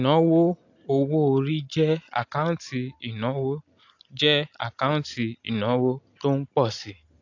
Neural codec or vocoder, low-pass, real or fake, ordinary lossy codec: none; 7.2 kHz; real; AAC, 48 kbps